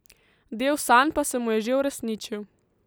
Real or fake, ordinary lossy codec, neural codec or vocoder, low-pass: real; none; none; none